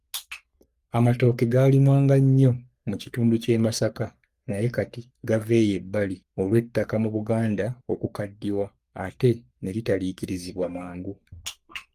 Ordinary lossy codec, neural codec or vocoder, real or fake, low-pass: Opus, 32 kbps; codec, 44.1 kHz, 3.4 kbps, Pupu-Codec; fake; 14.4 kHz